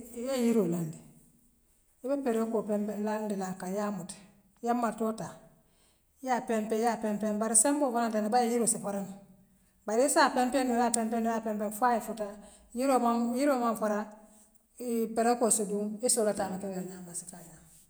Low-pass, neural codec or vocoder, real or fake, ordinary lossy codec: none; vocoder, 48 kHz, 128 mel bands, Vocos; fake; none